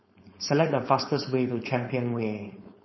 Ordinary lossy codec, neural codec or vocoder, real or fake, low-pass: MP3, 24 kbps; codec, 16 kHz, 4.8 kbps, FACodec; fake; 7.2 kHz